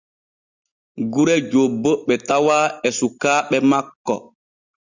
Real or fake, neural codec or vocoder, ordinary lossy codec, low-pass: real; none; Opus, 64 kbps; 7.2 kHz